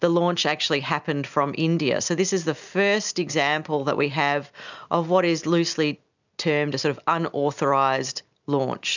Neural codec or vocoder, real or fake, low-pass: none; real; 7.2 kHz